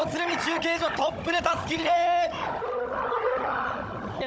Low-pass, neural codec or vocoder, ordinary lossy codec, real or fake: none; codec, 16 kHz, 16 kbps, FunCodec, trained on Chinese and English, 50 frames a second; none; fake